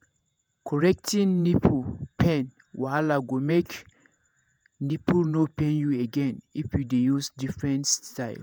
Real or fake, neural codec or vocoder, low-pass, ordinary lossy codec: fake; vocoder, 48 kHz, 128 mel bands, Vocos; none; none